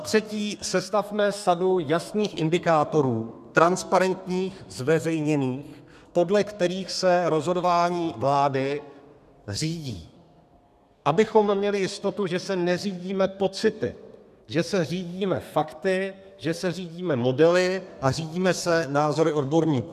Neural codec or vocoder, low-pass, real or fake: codec, 32 kHz, 1.9 kbps, SNAC; 14.4 kHz; fake